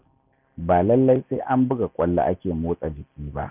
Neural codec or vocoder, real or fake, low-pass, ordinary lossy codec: none; real; 3.6 kHz; none